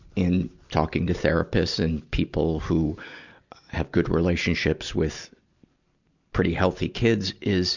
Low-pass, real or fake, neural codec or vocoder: 7.2 kHz; real; none